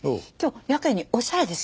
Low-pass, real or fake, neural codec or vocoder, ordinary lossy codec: none; real; none; none